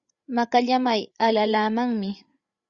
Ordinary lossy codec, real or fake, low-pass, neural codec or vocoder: Opus, 64 kbps; fake; 7.2 kHz; codec, 16 kHz, 8 kbps, FreqCodec, larger model